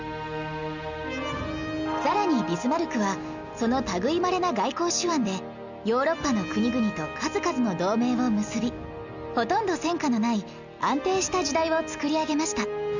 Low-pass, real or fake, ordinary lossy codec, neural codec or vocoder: 7.2 kHz; real; none; none